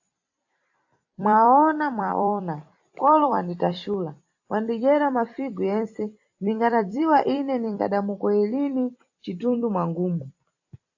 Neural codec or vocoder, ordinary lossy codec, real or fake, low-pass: vocoder, 44.1 kHz, 128 mel bands every 256 samples, BigVGAN v2; AAC, 48 kbps; fake; 7.2 kHz